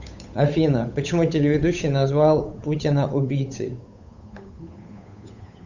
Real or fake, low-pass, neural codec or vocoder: fake; 7.2 kHz; codec, 16 kHz, 8 kbps, FunCodec, trained on Chinese and English, 25 frames a second